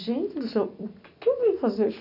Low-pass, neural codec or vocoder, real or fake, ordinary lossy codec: 5.4 kHz; none; real; none